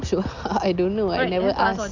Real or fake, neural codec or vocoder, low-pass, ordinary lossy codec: real; none; 7.2 kHz; MP3, 64 kbps